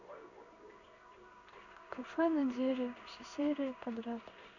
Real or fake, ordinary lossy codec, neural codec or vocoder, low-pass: fake; none; codec, 16 kHz in and 24 kHz out, 1 kbps, XY-Tokenizer; 7.2 kHz